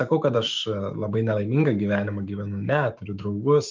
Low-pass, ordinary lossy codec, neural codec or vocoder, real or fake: 7.2 kHz; Opus, 24 kbps; autoencoder, 48 kHz, 128 numbers a frame, DAC-VAE, trained on Japanese speech; fake